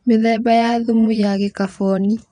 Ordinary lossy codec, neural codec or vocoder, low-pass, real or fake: none; vocoder, 22.05 kHz, 80 mel bands, Vocos; 9.9 kHz; fake